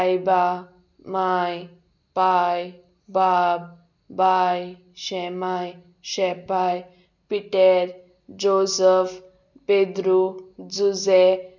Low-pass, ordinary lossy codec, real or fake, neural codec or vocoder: 7.2 kHz; none; real; none